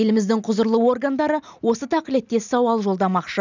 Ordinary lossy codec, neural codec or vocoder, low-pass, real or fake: none; none; 7.2 kHz; real